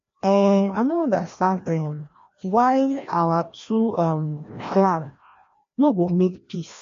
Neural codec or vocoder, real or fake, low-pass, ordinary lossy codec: codec, 16 kHz, 1 kbps, FreqCodec, larger model; fake; 7.2 kHz; MP3, 48 kbps